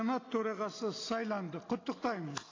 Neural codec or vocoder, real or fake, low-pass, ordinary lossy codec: none; real; 7.2 kHz; AAC, 32 kbps